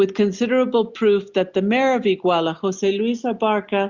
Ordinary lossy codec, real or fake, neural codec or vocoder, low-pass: Opus, 64 kbps; real; none; 7.2 kHz